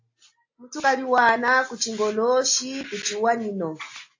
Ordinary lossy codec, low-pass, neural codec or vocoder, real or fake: AAC, 48 kbps; 7.2 kHz; none; real